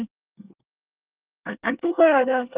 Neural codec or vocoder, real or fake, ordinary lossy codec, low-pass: codec, 24 kHz, 1 kbps, SNAC; fake; Opus, 32 kbps; 3.6 kHz